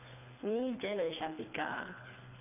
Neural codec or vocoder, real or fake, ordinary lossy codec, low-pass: codec, 24 kHz, 3 kbps, HILCodec; fake; none; 3.6 kHz